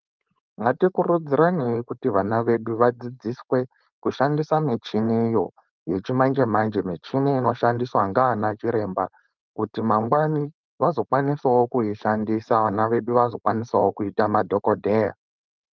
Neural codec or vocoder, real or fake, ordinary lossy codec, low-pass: codec, 16 kHz, 4.8 kbps, FACodec; fake; Opus, 24 kbps; 7.2 kHz